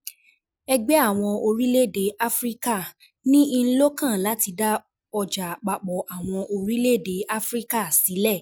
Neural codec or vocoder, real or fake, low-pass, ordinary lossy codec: none; real; none; none